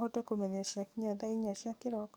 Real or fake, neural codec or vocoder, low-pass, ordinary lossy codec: fake; codec, 44.1 kHz, 7.8 kbps, DAC; none; none